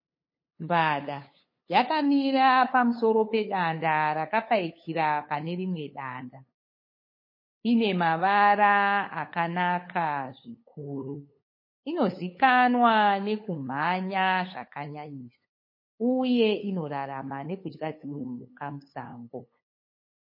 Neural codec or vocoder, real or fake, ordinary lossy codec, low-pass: codec, 16 kHz, 8 kbps, FunCodec, trained on LibriTTS, 25 frames a second; fake; MP3, 24 kbps; 5.4 kHz